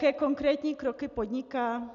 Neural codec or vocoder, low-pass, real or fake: none; 7.2 kHz; real